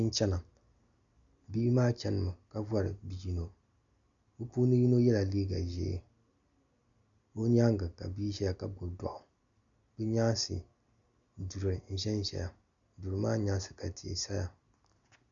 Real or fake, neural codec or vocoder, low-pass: real; none; 7.2 kHz